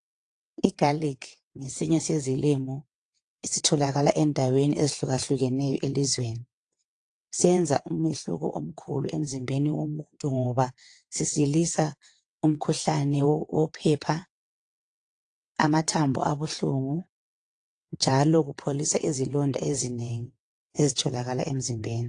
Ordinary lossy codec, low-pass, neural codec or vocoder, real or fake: AAC, 48 kbps; 9.9 kHz; vocoder, 22.05 kHz, 80 mel bands, WaveNeXt; fake